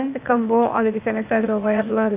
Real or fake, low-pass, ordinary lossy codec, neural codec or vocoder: fake; 3.6 kHz; none; codec, 16 kHz, 0.8 kbps, ZipCodec